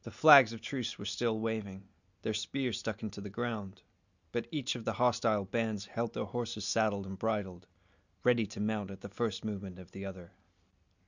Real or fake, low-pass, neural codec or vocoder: real; 7.2 kHz; none